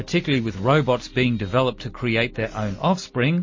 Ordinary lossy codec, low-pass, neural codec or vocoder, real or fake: MP3, 32 kbps; 7.2 kHz; none; real